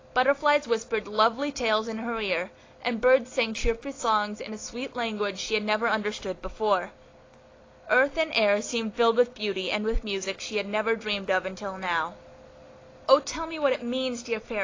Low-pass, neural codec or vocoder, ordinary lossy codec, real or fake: 7.2 kHz; none; AAC, 32 kbps; real